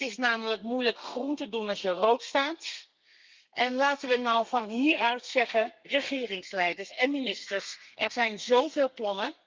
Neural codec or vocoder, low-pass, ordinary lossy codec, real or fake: codec, 32 kHz, 1.9 kbps, SNAC; 7.2 kHz; Opus, 24 kbps; fake